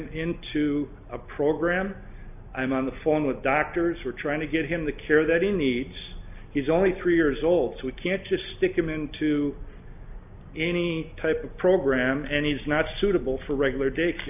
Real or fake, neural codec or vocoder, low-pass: real; none; 3.6 kHz